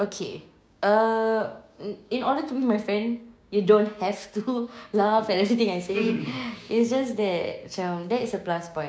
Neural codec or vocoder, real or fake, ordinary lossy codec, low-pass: codec, 16 kHz, 6 kbps, DAC; fake; none; none